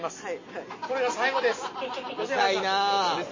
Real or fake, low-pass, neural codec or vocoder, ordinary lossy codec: real; 7.2 kHz; none; MP3, 32 kbps